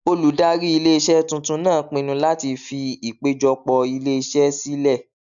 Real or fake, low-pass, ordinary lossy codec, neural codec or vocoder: real; 7.2 kHz; none; none